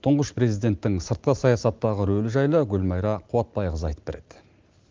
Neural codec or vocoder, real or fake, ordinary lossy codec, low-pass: none; real; Opus, 32 kbps; 7.2 kHz